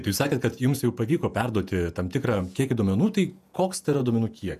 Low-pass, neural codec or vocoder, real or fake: 14.4 kHz; vocoder, 48 kHz, 128 mel bands, Vocos; fake